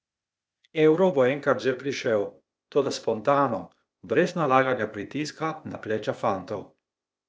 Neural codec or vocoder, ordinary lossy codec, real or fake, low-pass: codec, 16 kHz, 0.8 kbps, ZipCodec; none; fake; none